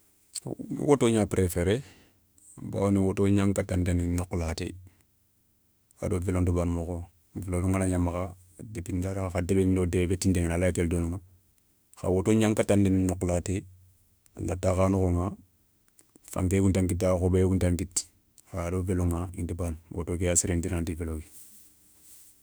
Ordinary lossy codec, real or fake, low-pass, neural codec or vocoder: none; fake; none; autoencoder, 48 kHz, 32 numbers a frame, DAC-VAE, trained on Japanese speech